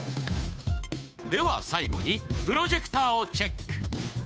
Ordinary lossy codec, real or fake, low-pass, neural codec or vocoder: none; fake; none; codec, 16 kHz, 2 kbps, FunCodec, trained on Chinese and English, 25 frames a second